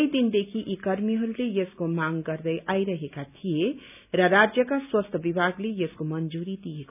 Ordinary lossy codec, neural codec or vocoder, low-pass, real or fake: none; none; 3.6 kHz; real